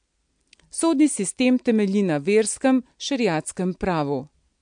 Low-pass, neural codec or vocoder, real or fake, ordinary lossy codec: 9.9 kHz; none; real; MP3, 64 kbps